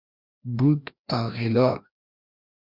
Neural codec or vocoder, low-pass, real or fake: codec, 16 kHz, 1 kbps, FreqCodec, larger model; 5.4 kHz; fake